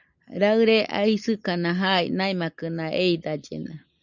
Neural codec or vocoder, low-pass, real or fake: none; 7.2 kHz; real